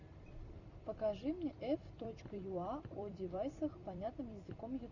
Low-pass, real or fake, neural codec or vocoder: 7.2 kHz; fake; vocoder, 44.1 kHz, 128 mel bands every 256 samples, BigVGAN v2